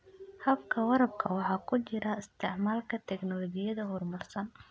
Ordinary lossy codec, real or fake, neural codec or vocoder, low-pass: none; real; none; none